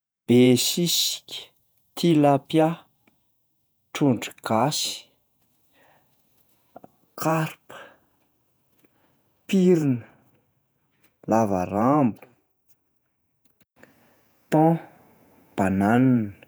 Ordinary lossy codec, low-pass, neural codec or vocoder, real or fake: none; none; vocoder, 48 kHz, 128 mel bands, Vocos; fake